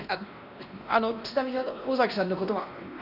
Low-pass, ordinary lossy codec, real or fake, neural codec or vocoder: 5.4 kHz; none; fake; codec, 16 kHz, 1 kbps, X-Codec, WavLM features, trained on Multilingual LibriSpeech